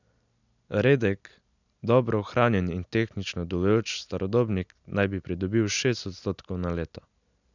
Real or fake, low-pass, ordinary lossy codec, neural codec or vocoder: real; 7.2 kHz; none; none